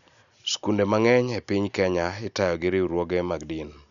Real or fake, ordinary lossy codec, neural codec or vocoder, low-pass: real; none; none; 7.2 kHz